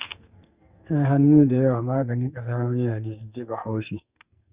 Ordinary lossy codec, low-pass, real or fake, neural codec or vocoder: Opus, 64 kbps; 3.6 kHz; fake; codec, 44.1 kHz, 2.6 kbps, SNAC